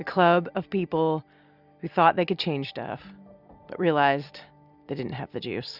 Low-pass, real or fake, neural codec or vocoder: 5.4 kHz; real; none